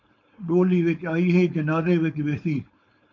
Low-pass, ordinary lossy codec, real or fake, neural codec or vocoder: 7.2 kHz; MP3, 64 kbps; fake; codec, 16 kHz, 4.8 kbps, FACodec